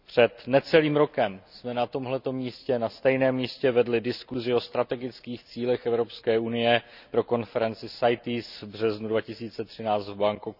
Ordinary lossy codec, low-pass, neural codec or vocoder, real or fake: none; 5.4 kHz; none; real